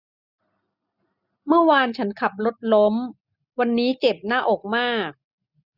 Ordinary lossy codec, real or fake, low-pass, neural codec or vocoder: none; real; 5.4 kHz; none